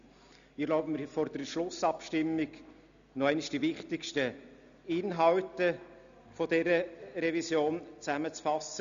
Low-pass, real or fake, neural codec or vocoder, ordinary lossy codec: 7.2 kHz; real; none; none